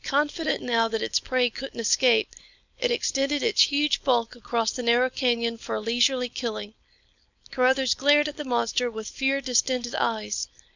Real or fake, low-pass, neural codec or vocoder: fake; 7.2 kHz; codec, 16 kHz, 4.8 kbps, FACodec